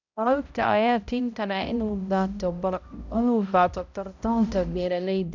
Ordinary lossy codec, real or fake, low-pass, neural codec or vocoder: none; fake; 7.2 kHz; codec, 16 kHz, 0.5 kbps, X-Codec, HuBERT features, trained on balanced general audio